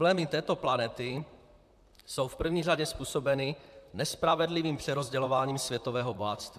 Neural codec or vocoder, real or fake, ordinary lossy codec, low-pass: vocoder, 44.1 kHz, 128 mel bands, Pupu-Vocoder; fake; AAC, 96 kbps; 14.4 kHz